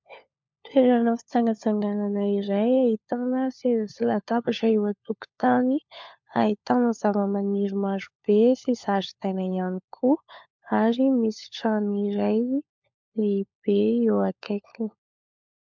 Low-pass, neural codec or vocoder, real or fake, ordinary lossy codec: 7.2 kHz; codec, 16 kHz, 4 kbps, FunCodec, trained on LibriTTS, 50 frames a second; fake; MP3, 64 kbps